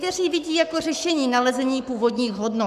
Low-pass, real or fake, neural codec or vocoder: 14.4 kHz; fake; codec, 44.1 kHz, 7.8 kbps, Pupu-Codec